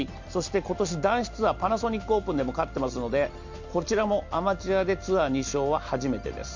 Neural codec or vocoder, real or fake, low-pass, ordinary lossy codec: none; real; 7.2 kHz; MP3, 64 kbps